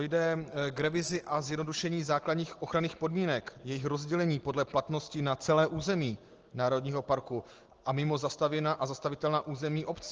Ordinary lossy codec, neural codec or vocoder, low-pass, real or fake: Opus, 16 kbps; none; 7.2 kHz; real